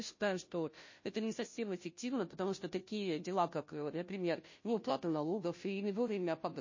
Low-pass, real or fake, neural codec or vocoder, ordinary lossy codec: 7.2 kHz; fake; codec, 16 kHz, 0.5 kbps, FunCodec, trained on Chinese and English, 25 frames a second; MP3, 32 kbps